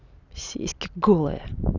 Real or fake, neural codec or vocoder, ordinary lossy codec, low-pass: real; none; none; 7.2 kHz